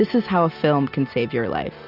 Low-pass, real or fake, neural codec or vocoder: 5.4 kHz; real; none